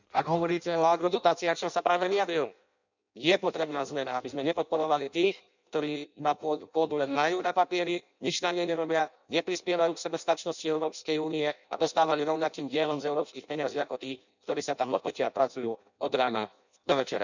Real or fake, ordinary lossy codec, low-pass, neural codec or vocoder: fake; none; 7.2 kHz; codec, 16 kHz in and 24 kHz out, 0.6 kbps, FireRedTTS-2 codec